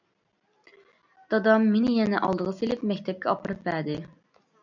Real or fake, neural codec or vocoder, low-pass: real; none; 7.2 kHz